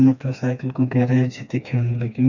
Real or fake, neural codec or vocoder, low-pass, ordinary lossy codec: fake; codec, 16 kHz, 2 kbps, FreqCodec, smaller model; 7.2 kHz; none